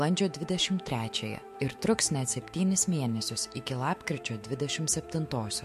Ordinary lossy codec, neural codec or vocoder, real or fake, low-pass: MP3, 64 kbps; autoencoder, 48 kHz, 128 numbers a frame, DAC-VAE, trained on Japanese speech; fake; 14.4 kHz